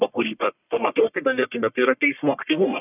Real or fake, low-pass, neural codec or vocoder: fake; 3.6 kHz; codec, 44.1 kHz, 1.7 kbps, Pupu-Codec